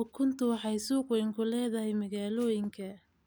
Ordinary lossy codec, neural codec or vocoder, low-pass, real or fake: none; none; none; real